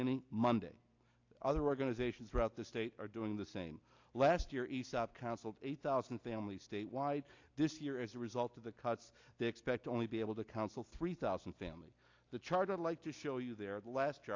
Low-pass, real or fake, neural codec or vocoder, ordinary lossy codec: 7.2 kHz; real; none; AAC, 48 kbps